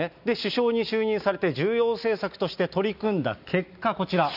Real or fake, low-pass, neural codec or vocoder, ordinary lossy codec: real; 5.4 kHz; none; none